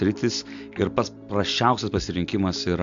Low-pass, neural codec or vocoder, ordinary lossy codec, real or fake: 7.2 kHz; none; MP3, 64 kbps; real